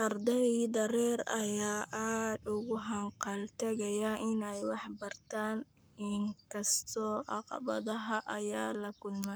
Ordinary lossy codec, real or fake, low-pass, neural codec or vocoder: none; fake; none; codec, 44.1 kHz, 7.8 kbps, Pupu-Codec